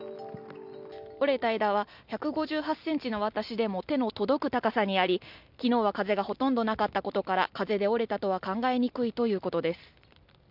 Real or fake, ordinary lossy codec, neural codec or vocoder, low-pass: real; none; none; 5.4 kHz